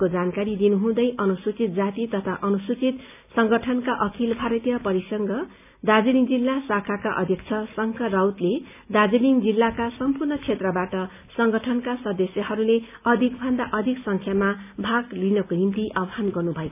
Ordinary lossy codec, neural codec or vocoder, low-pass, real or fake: none; none; 3.6 kHz; real